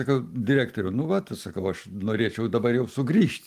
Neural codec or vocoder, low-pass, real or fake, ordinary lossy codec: none; 14.4 kHz; real; Opus, 32 kbps